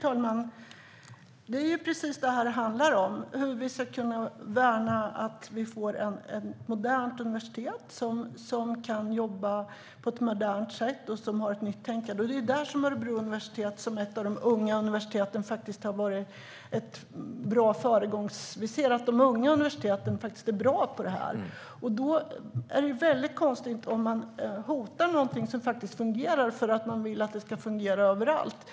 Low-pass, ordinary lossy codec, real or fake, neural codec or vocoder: none; none; real; none